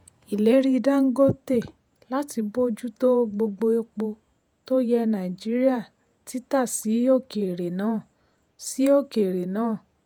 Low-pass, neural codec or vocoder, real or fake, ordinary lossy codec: none; vocoder, 48 kHz, 128 mel bands, Vocos; fake; none